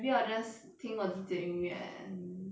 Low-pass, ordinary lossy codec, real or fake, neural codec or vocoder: none; none; real; none